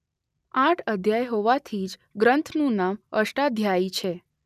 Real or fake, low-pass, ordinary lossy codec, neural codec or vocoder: real; 14.4 kHz; none; none